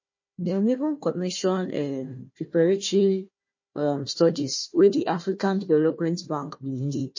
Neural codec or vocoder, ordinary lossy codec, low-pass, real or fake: codec, 16 kHz, 1 kbps, FunCodec, trained on Chinese and English, 50 frames a second; MP3, 32 kbps; 7.2 kHz; fake